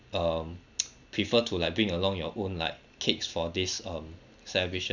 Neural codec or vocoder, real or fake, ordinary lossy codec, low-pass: none; real; none; 7.2 kHz